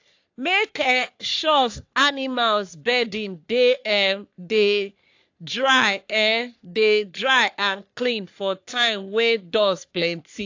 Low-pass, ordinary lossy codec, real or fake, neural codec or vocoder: 7.2 kHz; AAC, 48 kbps; fake; codec, 44.1 kHz, 3.4 kbps, Pupu-Codec